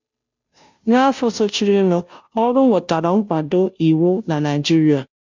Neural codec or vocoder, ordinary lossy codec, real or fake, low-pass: codec, 16 kHz, 0.5 kbps, FunCodec, trained on Chinese and English, 25 frames a second; MP3, 64 kbps; fake; 7.2 kHz